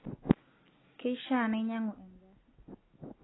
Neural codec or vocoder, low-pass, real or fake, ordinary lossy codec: none; 7.2 kHz; real; AAC, 16 kbps